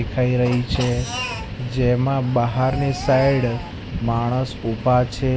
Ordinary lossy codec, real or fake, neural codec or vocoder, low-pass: none; real; none; none